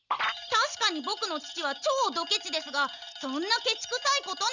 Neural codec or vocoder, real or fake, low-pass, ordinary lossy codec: none; real; 7.2 kHz; none